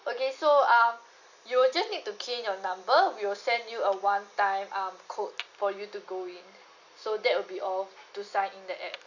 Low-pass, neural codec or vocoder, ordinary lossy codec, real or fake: 7.2 kHz; none; Opus, 64 kbps; real